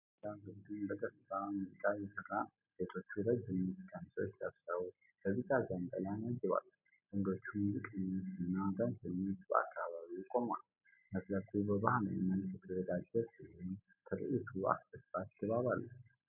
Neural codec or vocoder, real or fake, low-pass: none; real; 3.6 kHz